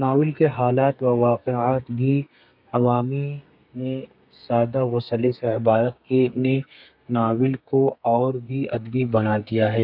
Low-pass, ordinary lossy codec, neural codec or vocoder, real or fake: 5.4 kHz; none; codec, 32 kHz, 1.9 kbps, SNAC; fake